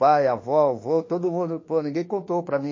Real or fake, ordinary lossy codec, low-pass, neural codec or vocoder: fake; MP3, 32 kbps; 7.2 kHz; autoencoder, 48 kHz, 32 numbers a frame, DAC-VAE, trained on Japanese speech